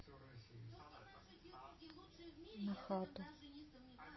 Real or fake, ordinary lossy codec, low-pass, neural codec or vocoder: real; MP3, 24 kbps; 7.2 kHz; none